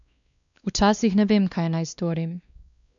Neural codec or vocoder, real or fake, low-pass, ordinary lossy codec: codec, 16 kHz, 2 kbps, X-Codec, WavLM features, trained on Multilingual LibriSpeech; fake; 7.2 kHz; none